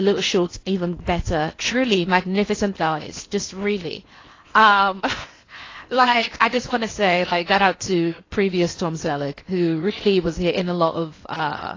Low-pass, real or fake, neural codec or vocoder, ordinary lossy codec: 7.2 kHz; fake; codec, 16 kHz in and 24 kHz out, 0.8 kbps, FocalCodec, streaming, 65536 codes; AAC, 32 kbps